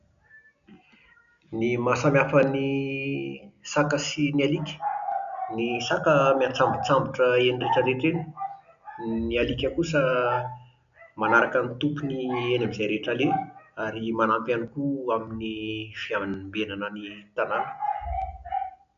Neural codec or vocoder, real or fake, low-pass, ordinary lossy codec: none; real; 7.2 kHz; none